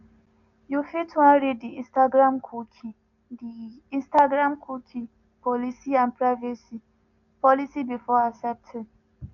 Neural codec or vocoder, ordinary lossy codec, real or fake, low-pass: none; none; real; 7.2 kHz